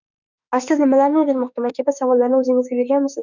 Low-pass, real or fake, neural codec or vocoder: 7.2 kHz; fake; autoencoder, 48 kHz, 32 numbers a frame, DAC-VAE, trained on Japanese speech